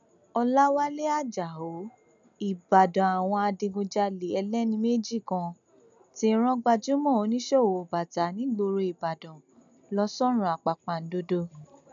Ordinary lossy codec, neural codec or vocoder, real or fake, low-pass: none; none; real; 7.2 kHz